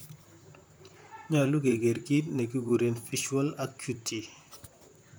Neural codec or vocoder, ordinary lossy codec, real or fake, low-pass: vocoder, 44.1 kHz, 128 mel bands, Pupu-Vocoder; none; fake; none